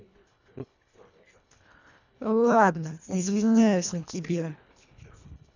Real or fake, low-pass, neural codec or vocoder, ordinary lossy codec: fake; 7.2 kHz; codec, 24 kHz, 1.5 kbps, HILCodec; none